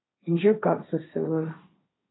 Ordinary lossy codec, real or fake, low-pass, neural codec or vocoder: AAC, 16 kbps; fake; 7.2 kHz; codec, 16 kHz, 1.1 kbps, Voila-Tokenizer